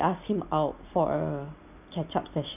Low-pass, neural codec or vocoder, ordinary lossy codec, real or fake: 3.6 kHz; none; none; real